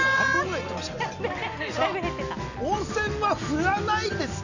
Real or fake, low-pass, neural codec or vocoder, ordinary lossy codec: real; 7.2 kHz; none; none